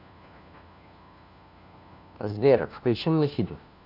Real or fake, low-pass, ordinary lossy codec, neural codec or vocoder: fake; 5.4 kHz; none; codec, 16 kHz, 1 kbps, FunCodec, trained on LibriTTS, 50 frames a second